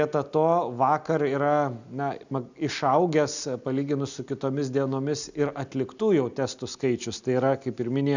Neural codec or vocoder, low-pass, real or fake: none; 7.2 kHz; real